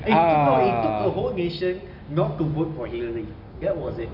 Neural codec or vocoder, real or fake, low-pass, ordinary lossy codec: none; real; 5.4 kHz; none